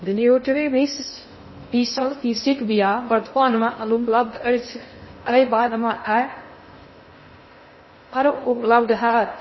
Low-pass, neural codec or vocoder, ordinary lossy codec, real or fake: 7.2 kHz; codec, 16 kHz in and 24 kHz out, 0.6 kbps, FocalCodec, streaming, 2048 codes; MP3, 24 kbps; fake